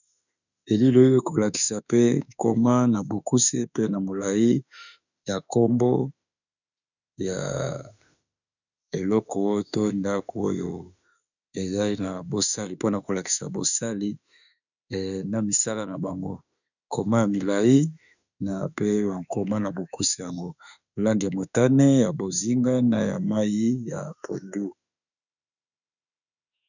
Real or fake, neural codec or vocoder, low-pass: fake; autoencoder, 48 kHz, 32 numbers a frame, DAC-VAE, trained on Japanese speech; 7.2 kHz